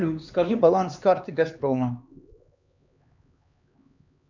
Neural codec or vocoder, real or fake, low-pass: codec, 16 kHz, 2 kbps, X-Codec, HuBERT features, trained on LibriSpeech; fake; 7.2 kHz